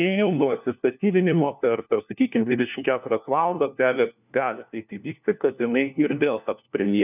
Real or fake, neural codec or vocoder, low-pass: fake; codec, 16 kHz, 1 kbps, FunCodec, trained on LibriTTS, 50 frames a second; 3.6 kHz